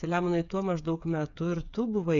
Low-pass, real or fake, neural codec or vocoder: 7.2 kHz; fake; codec, 16 kHz, 8 kbps, FreqCodec, smaller model